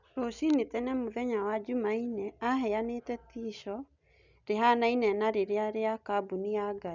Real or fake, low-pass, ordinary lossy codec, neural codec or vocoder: real; 7.2 kHz; none; none